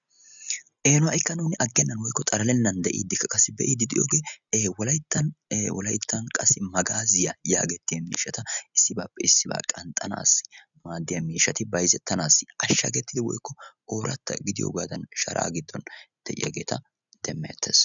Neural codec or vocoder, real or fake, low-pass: none; real; 7.2 kHz